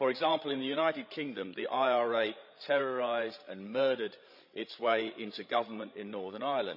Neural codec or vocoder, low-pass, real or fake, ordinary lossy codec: codec, 16 kHz, 16 kbps, FreqCodec, larger model; 5.4 kHz; fake; none